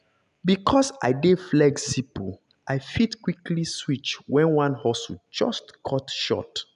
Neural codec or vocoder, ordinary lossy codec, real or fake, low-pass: none; none; real; 10.8 kHz